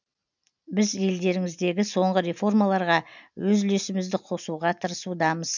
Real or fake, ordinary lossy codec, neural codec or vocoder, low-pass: real; none; none; 7.2 kHz